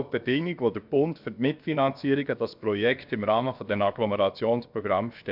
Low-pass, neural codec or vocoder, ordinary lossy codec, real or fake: 5.4 kHz; codec, 16 kHz, about 1 kbps, DyCAST, with the encoder's durations; none; fake